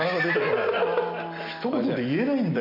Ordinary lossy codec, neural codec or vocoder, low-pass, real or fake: none; none; 5.4 kHz; real